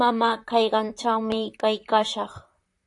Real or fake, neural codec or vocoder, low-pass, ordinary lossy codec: fake; vocoder, 44.1 kHz, 128 mel bands, Pupu-Vocoder; 10.8 kHz; AAC, 64 kbps